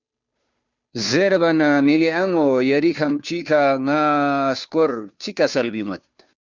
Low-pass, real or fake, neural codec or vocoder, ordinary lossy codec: 7.2 kHz; fake; codec, 16 kHz, 2 kbps, FunCodec, trained on Chinese and English, 25 frames a second; Opus, 64 kbps